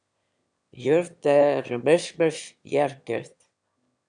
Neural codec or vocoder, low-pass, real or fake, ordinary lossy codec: autoencoder, 22.05 kHz, a latent of 192 numbers a frame, VITS, trained on one speaker; 9.9 kHz; fake; MP3, 96 kbps